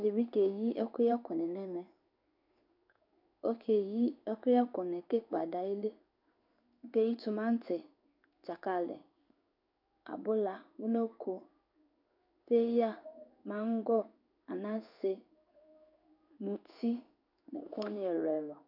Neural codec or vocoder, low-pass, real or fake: codec, 16 kHz in and 24 kHz out, 1 kbps, XY-Tokenizer; 5.4 kHz; fake